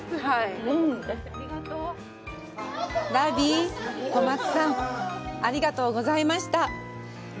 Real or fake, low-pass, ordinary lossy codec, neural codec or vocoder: real; none; none; none